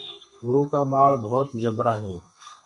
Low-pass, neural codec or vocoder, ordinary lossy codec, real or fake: 10.8 kHz; codec, 32 kHz, 1.9 kbps, SNAC; MP3, 48 kbps; fake